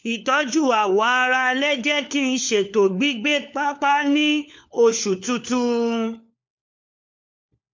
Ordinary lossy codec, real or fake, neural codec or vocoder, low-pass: MP3, 64 kbps; fake; codec, 16 kHz, 4 kbps, FunCodec, trained on LibriTTS, 50 frames a second; 7.2 kHz